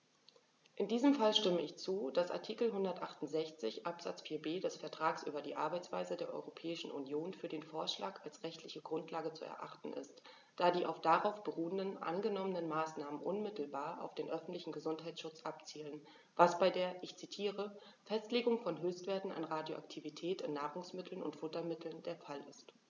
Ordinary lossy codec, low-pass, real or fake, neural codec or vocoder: none; 7.2 kHz; real; none